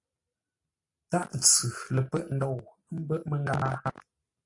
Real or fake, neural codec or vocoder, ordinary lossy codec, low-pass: real; none; AAC, 48 kbps; 10.8 kHz